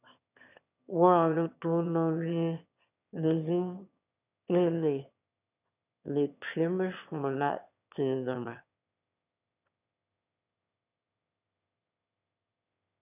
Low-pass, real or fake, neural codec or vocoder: 3.6 kHz; fake; autoencoder, 22.05 kHz, a latent of 192 numbers a frame, VITS, trained on one speaker